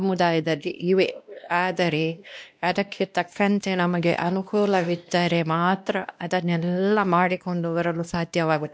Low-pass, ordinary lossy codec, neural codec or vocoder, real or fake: none; none; codec, 16 kHz, 1 kbps, X-Codec, WavLM features, trained on Multilingual LibriSpeech; fake